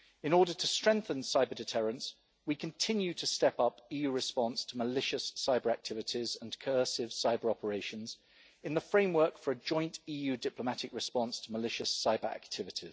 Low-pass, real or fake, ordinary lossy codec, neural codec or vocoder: none; real; none; none